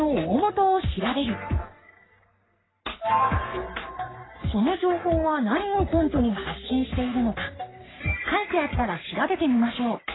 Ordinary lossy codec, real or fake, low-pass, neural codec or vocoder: AAC, 16 kbps; fake; 7.2 kHz; codec, 44.1 kHz, 3.4 kbps, Pupu-Codec